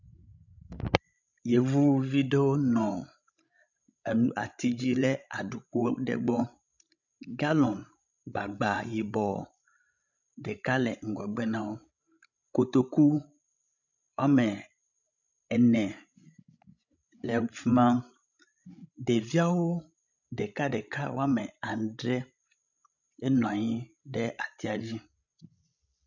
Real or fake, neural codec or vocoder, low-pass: fake; codec, 16 kHz, 16 kbps, FreqCodec, larger model; 7.2 kHz